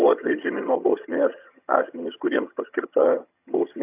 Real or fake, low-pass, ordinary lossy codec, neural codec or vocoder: fake; 3.6 kHz; AAC, 32 kbps; vocoder, 22.05 kHz, 80 mel bands, HiFi-GAN